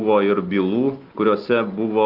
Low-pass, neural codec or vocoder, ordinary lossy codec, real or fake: 5.4 kHz; none; Opus, 24 kbps; real